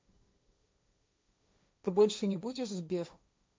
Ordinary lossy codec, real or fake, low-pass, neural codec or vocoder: none; fake; none; codec, 16 kHz, 1.1 kbps, Voila-Tokenizer